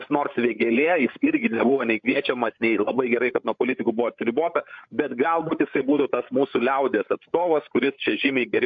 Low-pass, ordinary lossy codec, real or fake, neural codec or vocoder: 7.2 kHz; AAC, 64 kbps; fake; codec, 16 kHz, 8 kbps, FreqCodec, larger model